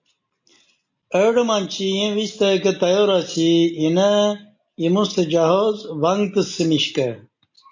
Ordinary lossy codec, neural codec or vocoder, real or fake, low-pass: MP3, 48 kbps; none; real; 7.2 kHz